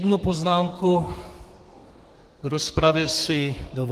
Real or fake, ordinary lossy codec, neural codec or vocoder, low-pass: fake; Opus, 24 kbps; codec, 32 kHz, 1.9 kbps, SNAC; 14.4 kHz